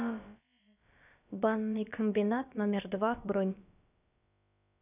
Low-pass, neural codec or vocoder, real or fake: 3.6 kHz; codec, 16 kHz, about 1 kbps, DyCAST, with the encoder's durations; fake